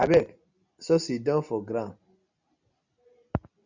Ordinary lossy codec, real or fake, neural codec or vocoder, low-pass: Opus, 64 kbps; real; none; 7.2 kHz